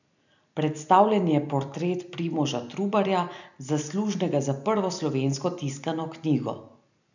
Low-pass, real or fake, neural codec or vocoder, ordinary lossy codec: 7.2 kHz; real; none; none